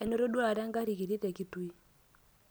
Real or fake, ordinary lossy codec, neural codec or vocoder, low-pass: real; none; none; none